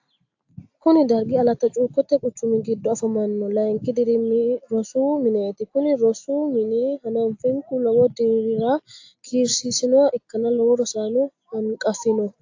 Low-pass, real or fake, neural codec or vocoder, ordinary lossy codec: 7.2 kHz; real; none; AAC, 48 kbps